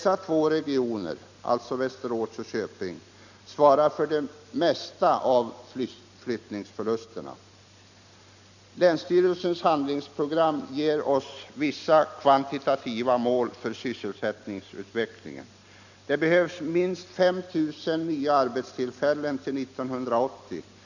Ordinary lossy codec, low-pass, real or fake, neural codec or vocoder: none; 7.2 kHz; real; none